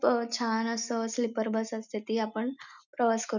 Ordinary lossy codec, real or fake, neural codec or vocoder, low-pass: none; real; none; 7.2 kHz